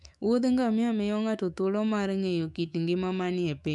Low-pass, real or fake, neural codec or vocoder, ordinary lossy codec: 10.8 kHz; fake; autoencoder, 48 kHz, 128 numbers a frame, DAC-VAE, trained on Japanese speech; none